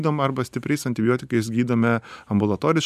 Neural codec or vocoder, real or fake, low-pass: none; real; 14.4 kHz